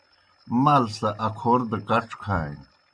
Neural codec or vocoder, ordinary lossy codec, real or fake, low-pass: none; AAC, 64 kbps; real; 9.9 kHz